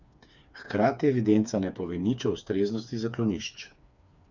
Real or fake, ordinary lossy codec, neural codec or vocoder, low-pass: fake; none; codec, 16 kHz, 4 kbps, FreqCodec, smaller model; 7.2 kHz